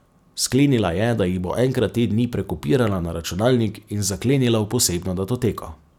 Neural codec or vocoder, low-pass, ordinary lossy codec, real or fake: none; 19.8 kHz; none; real